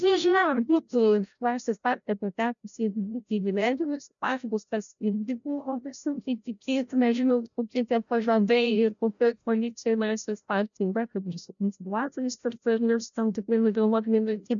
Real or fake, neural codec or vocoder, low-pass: fake; codec, 16 kHz, 0.5 kbps, FreqCodec, larger model; 7.2 kHz